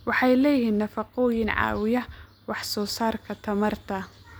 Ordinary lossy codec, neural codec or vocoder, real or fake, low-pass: none; none; real; none